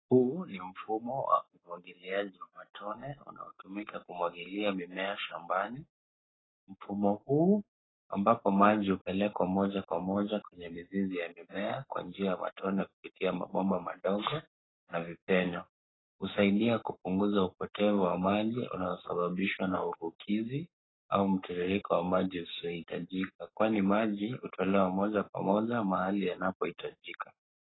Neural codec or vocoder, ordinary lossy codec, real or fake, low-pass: codec, 44.1 kHz, 7.8 kbps, Pupu-Codec; AAC, 16 kbps; fake; 7.2 kHz